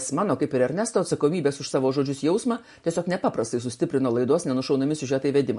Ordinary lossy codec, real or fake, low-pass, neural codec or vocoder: MP3, 48 kbps; real; 14.4 kHz; none